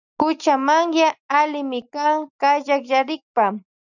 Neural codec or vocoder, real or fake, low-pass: none; real; 7.2 kHz